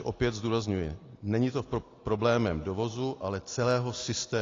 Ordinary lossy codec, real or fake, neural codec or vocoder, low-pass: AAC, 32 kbps; real; none; 7.2 kHz